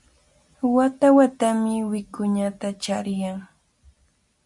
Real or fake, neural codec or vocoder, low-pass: real; none; 10.8 kHz